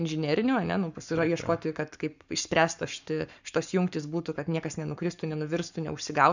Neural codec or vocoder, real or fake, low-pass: codec, 44.1 kHz, 7.8 kbps, Pupu-Codec; fake; 7.2 kHz